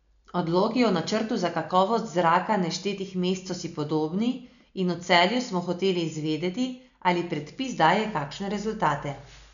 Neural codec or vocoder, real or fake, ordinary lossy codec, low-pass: none; real; none; 7.2 kHz